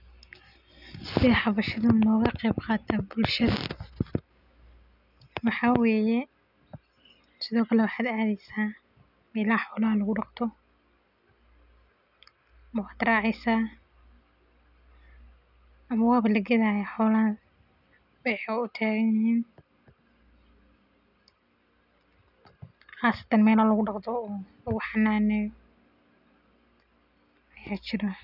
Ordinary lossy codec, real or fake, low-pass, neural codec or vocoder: none; real; 5.4 kHz; none